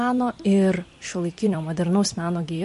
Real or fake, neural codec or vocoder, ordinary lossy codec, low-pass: fake; vocoder, 44.1 kHz, 128 mel bands every 256 samples, BigVGAN v2; MP3, 48 kbps; 14.4 kHz